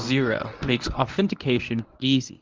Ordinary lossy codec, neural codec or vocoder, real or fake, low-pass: Opus, 24 kbps; codec, 24 kHz, 0.9 kbps, WavTokenizer, medium speech release version 1; fake; 7.2 kHz